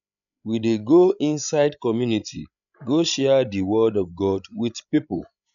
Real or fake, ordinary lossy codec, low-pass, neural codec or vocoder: fake; none; 7.2 kHz; codec, 16 kHz, 16 kbps, FreqCodec, larger model